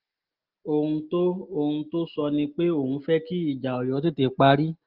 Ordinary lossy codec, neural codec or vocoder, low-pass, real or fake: Opus, 24 kbps; none; 5.4 kHz; real